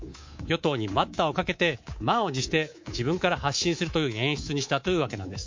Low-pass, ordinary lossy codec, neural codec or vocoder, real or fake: 7.2 kHz; MP3, 48 kbps; codec, 24 kHz, 3.1 kbps, DualCodec; fake